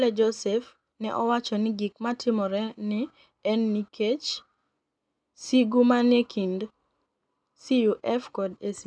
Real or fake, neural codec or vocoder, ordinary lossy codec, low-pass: real; none; none; 9.9 kHz